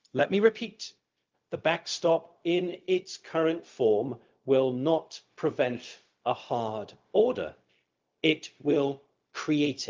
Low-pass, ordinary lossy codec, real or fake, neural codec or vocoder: 7.2 kHz; Opus, 24 kbps; fake; codec, 16 kHz, 0.4 kbps, LongCat-Audio-Codec